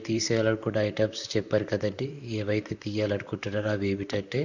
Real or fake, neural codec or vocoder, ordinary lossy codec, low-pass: fake; vocoder, 44.1 kHz, 128 mel bands, Pupu-Vocoder; none; 7.2 kHz